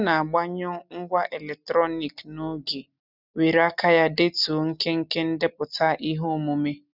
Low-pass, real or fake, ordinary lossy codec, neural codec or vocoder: 5.4 kHz; real; none; none